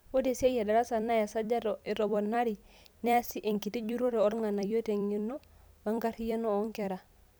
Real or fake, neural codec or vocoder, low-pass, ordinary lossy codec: fake; vocoder, 44.1 kHz, 128 mel bands every 256 samples, BigVGAN v2; none; none